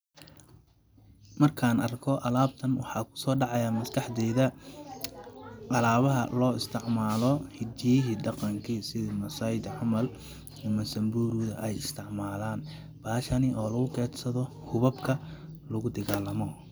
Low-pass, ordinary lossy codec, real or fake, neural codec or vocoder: none; none; real; none